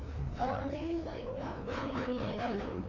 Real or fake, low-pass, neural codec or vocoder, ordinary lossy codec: fake; 7.2 kHz; codec, 16 kHz, 2 kbps, FreqCodec, larger model; none